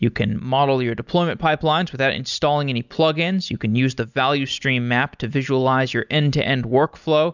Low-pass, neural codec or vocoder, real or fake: 7.2 kHz; none; real